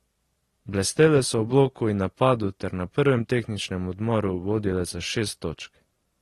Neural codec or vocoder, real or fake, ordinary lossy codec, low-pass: none; real; AAC, 32 kbps; 19.8 kHz